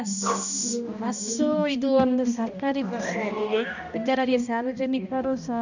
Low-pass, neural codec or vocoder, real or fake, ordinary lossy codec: 7.2 kHz; codec, 16 kHz, 1 kbps, X-Codec, HuBERT features, trained on balanced general audio; fake; none